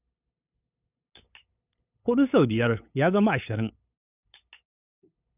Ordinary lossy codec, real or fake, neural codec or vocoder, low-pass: none; fake; codec, 16 kHz, 8 kbps, FunCodec, trained on LibriTTS, 25 frames a second; 3.6 kHz